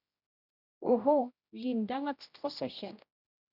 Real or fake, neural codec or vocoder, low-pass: fake; codec, 16 kHz, 1 kbps, X-Codec, HuBERT features, trained on general audio; 5.4 kHz